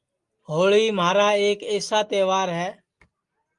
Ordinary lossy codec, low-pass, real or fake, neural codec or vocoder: Opus, 32 kbps; 10.8 kHz; real; none